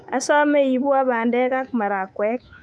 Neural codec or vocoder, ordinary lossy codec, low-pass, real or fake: codec, 24 kHz, 3.1 kbps, DualCodec; none; none; fake